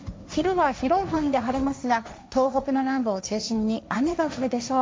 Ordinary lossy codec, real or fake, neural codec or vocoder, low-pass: none; fake; codec, 16 kHz, 1.1 kbps, Voila-Tokenizer; none